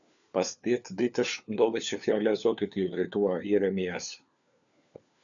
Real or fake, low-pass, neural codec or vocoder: fake; 7.2 kHz; codec, 16 kHz, 4 kbps, FunCodec, trained on LibriTTS, 50 frames a second